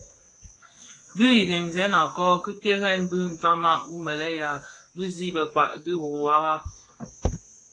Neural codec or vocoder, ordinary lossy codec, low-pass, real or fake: codec, 32 kHz, 1.9 kbps, SNAC; AAC, 48 kbps; 10.8 kHz; fake